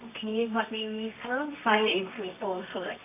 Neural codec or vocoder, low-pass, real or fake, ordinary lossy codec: codec, 24 kHz, 0.9 kbps, WavTokenizer, medium music audio release; 3.6 kHz; fake; AAC, 24 kbps